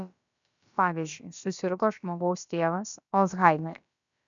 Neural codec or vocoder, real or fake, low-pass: codec, 16 kHz, about 1 kbps, DyCAST, with the encoder's durations; fake; 7.2 kHz